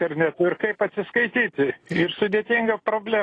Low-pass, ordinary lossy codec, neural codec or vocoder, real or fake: 9.9 kHz; AAC, 32 kbps; none; real